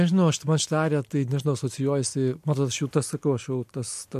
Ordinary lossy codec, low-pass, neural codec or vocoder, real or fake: MP3, 64 kbps; 14.4 kHz; none; real